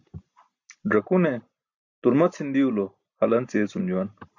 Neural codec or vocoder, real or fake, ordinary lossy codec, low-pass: none; real; MP3, 64 kbps; 7.2 kHz